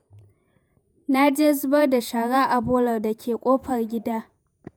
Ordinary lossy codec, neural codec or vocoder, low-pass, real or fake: none; vocoder, 48 kHz, 128 mel bands, Vocos; none; fake